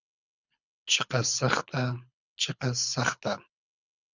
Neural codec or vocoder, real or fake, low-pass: codec, 24 kHz, 6 kbps, HILCodec; fake; 7.2 kHz